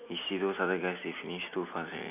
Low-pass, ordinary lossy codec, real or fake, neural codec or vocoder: 3.6 kHz; none; real; none